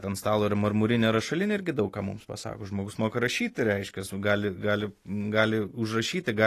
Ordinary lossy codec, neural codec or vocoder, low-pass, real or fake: AAC, 48 kbps; none; 14.4 kHz; real